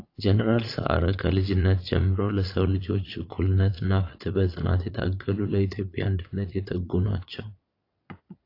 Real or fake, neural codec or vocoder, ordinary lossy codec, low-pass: fake; vocoder, 22.05 kHz, 80 mel bands, Vocos; AAC, 32 kbps; 5.4 kHz